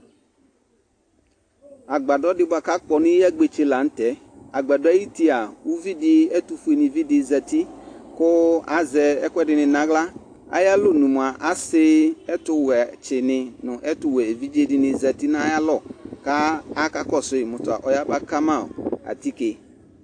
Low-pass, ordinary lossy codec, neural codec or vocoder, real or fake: 9.9 kHz; AAC, 48 kbps; none; real